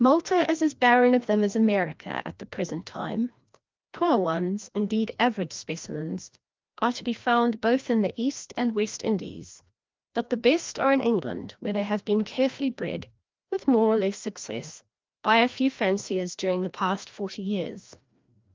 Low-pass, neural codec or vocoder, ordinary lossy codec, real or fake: 7.2 kHz; codec, 16 kHz, 1 kbps, FreqCodec, larger model; Opus, 24 kbps; fake